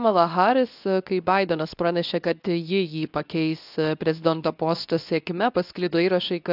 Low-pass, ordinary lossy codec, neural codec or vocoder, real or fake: 5.4 kHz; AAC, 48 kbps; codec, 24 kHz, 0.9 kbps, WavTokenizer, medium speech release version 2; fake